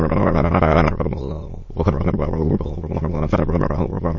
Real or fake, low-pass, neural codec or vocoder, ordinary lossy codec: fake; 7.2 kHz; autoencoder, 22.05 kHz, a latent of 192 numbers a frame, VITS, trained on many speakers; MP3, 32 kbps